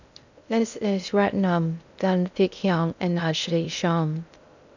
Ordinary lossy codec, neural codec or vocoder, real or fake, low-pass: none; codec, 16 kHz in and 24 kHz out, 0.6 kbps, FocalCodec, streaming, 2048 codes; fake; 7.2 kHz